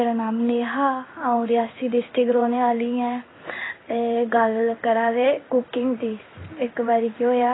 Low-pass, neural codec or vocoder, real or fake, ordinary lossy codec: 7.2 kHz; codec, 16 kHz in and 24 kHz out, 1 kbps, XY-Tokenizer; fake; AAC, 16 kbps